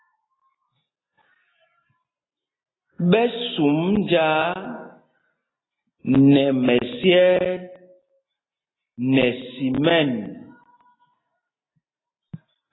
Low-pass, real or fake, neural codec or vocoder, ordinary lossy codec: 7.2 kHz; real; none; AAC, 16 kbps